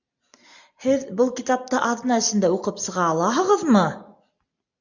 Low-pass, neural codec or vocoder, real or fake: 7.2 kHz; none; real